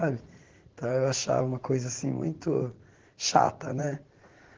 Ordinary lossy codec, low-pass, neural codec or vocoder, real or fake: Opus, 16 kbps; 7.2 kHz; vocoder, 44.1 kHz, 80 mel bands, Vocos; fake